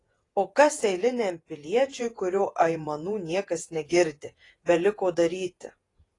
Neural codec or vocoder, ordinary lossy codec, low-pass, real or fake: vocoder, 48 kHz, 128 mel bands, Vocos; AAC, 32 kbps; 10.8 kHz; fake